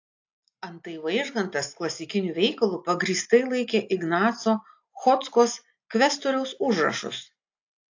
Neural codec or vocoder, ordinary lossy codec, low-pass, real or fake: none; AAC, 48 kbps; 7.2 kHz; real